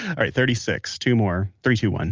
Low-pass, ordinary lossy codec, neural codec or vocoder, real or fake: 7.2 kHz; Opus, 24 kbps; none; real